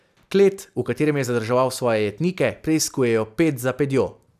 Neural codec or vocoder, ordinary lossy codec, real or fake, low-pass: none; none; real; 14.4 kHz